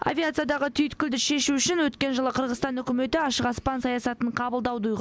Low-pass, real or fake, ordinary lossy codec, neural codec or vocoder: none; real; none; none